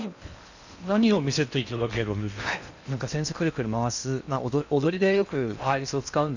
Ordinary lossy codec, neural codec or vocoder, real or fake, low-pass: none; codec, 16 kHz in and 24 kHz out, 0.8 kbps, FocalCodec, streaming, 65536 codes; fake; 7.2 kHz